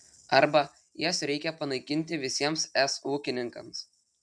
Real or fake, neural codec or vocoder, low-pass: real; none; 9.9 kHz